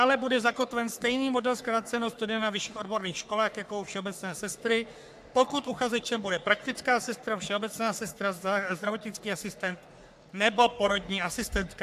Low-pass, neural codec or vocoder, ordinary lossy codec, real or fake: 14.4 kHz; codec, 44.1 kHz, 3.4 kbps, Pupu-Codec; AAC, 96 kbps; fake